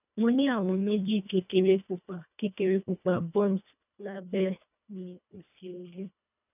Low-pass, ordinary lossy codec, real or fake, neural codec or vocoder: 3.6 kHz; none; fake; codec, 24 kHz, 1.5 kbps, HILCodec